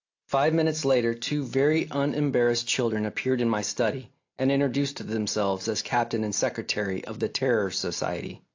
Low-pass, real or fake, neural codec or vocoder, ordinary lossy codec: 7.2 kHz; real; none; AAC, 48 kbps